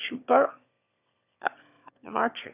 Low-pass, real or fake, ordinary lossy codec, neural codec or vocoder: 3.6 kHz; fake; none; autoencoder, 22.05 kHz, a latent of 192 numbers a frame, VITS, trained on one speaker